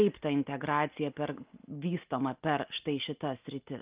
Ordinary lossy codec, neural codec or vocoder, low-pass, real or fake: Opus, 24 kbps; none; 3.6 kHz; real